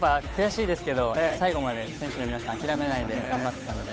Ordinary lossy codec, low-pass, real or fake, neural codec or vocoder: none; none; fake; codec, 16 kHz, 8 kbps, FunCodec, trained on Chinese and English, 25 frames a second